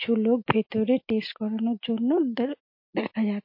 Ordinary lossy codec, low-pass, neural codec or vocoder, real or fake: MP3, 32 kbps; 5.4 kHz; none; real